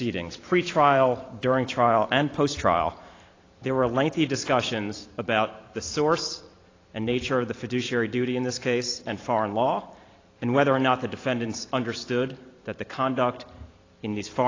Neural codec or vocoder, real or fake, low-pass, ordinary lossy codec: vocoder, 44.1 kHz, 128 mel bands every 512 samples, BigVGAN v2; fake; 7.2 kHz; AAC, 32 kbps